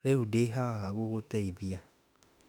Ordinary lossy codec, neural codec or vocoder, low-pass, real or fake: none; autoencoder, 48 kHz, 32 numbers a frame, DAC-VAE, trained on Japanese speech; 19.8 kHz; fake